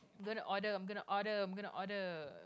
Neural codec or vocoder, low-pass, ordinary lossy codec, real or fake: none; none; none; real